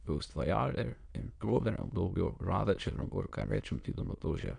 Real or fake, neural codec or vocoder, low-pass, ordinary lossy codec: fake; autoencoder, 22.05 kHz, a latent of 192 numbers a frame, VITS, trained on many speakers; 9.9 kHz; none